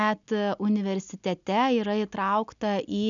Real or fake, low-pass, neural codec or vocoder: real; 7.2 kHz; none